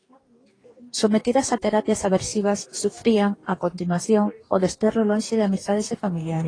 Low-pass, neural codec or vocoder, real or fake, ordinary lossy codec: 9.9 kHz; codec, 44.1 kHz, 2.6 kbps, DAC; fake; AAC, 32 kbps